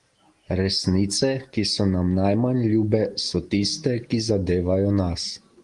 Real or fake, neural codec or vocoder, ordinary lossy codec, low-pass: real; none; Opus, 24 kbps; 10.8 kHz